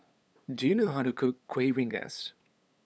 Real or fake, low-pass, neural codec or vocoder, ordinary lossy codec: fake; none; codec, 16 kHz, 8 kbps, FunCodec, trained on LibriTTS, 25 frames a second; none